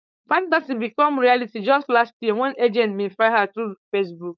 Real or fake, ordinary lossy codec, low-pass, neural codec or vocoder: fake; none; 7.2 kHz; codec, 16 kHz, 4.8 kbps, FACodec